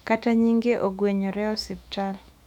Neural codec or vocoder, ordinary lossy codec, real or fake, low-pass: autoencoder, 48 kHz, 128 numbers a frame, DAC-VAE, trained on Japanese speech; none; fake; 19.8 kHz